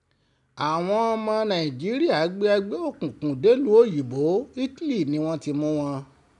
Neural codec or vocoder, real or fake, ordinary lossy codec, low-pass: none; real; none; 10.8 kHz